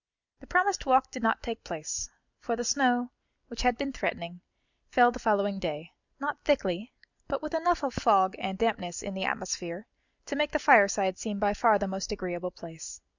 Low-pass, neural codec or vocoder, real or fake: 7.2 kHz; none; real